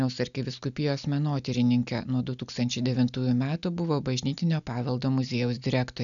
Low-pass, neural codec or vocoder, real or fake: 7.2 kHz; none; real